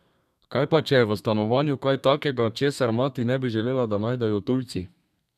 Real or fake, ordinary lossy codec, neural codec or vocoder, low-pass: fake; none; codec, 32 kHz, 1.9 kbps, SNAC; 14.4 kHz